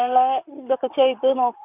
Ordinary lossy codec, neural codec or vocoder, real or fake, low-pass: none; vocoder, 44.1 kHz, 128 mel bands, Pupu-Vocoder; fake; 3.6 kHz